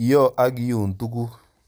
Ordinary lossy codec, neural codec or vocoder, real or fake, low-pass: none; vocoder, 44.1 kHz, 128 mel bands every 256 samples, BigVGAN v2; fake; none